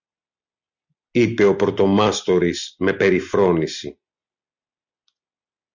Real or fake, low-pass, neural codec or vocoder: real; 7.2 kHz; none